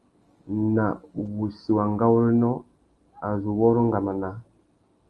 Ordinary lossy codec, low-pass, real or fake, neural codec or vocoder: Opus, 24 kbps; 10.8 kHz; real; none